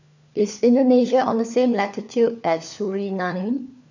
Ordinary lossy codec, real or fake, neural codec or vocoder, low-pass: none; fake; codec, 16 kHz, 4 kbps, FunCodec, trained on LibriTTS, 50 frames a second; 7.2 kHz